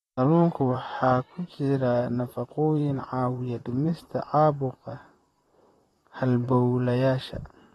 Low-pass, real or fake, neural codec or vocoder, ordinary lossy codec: 19.8 kHz; fake; vocoder, 44.1 kHz, 128 mel bands, Pupu-Vocoder; AAC, 32 kbps